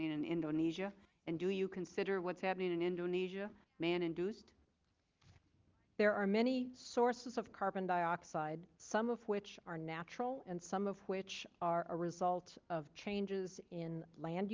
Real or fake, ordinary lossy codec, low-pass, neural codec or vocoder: real; Opus, 32 kbps; 7.2 kHz; none